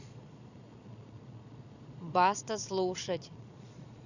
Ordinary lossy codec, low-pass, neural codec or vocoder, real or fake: none; 7.2 kHz; vocoder, 22.05 kHz, 80 mel bands, Vocos; fake